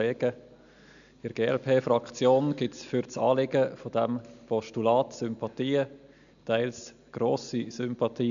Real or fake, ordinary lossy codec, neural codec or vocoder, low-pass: real; none; none; 7.2 kHz